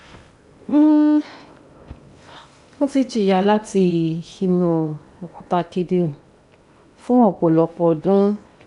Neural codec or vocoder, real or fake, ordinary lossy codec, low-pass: codec, 16 kHz in and 24 kHz out, 0.8 kbps, FocalCodec, streaming, 65536 codes; fake; none; 10.8 kHz